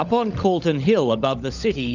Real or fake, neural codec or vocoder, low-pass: fake; codec, 16 kHz, 16 kbps, FunCodec, trained on LibriTTS, 50 frames a second; 7.2 kHz